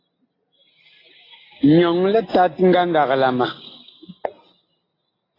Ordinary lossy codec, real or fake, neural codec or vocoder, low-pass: AAC, 24 kbps; real; none; 5.4 kHz